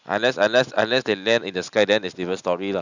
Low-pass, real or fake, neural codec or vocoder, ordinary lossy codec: 7.2 kHz; real; none; none